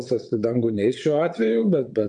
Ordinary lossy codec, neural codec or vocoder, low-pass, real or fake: MP3, 64 kbps; vocoder, 22.05 kHz, 80 mel bands, WaveNeXt; 9.9 kHz; fake